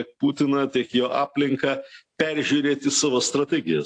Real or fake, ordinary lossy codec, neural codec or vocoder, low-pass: real; AAC, 48 kbps; none; 9.9 kHz